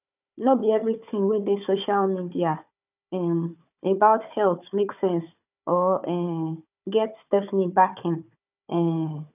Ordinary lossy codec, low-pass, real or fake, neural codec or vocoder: none; 3.6 kHz; fake; codec, 16 kHz, 4 kbps, FunCodec, trained on Chinese and English, 50 frames a second